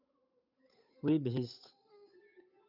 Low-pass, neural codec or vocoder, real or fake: 5.4 kHz; codec, 16 kHz, 8 kbps, FunCodec, trained on Chinese and English, 25 frames a second; fake